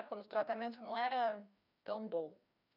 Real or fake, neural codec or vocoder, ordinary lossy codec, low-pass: fake; codec, 16 kHz, 1 kbps, FreqCodec, larger model; none; 5.4 kHz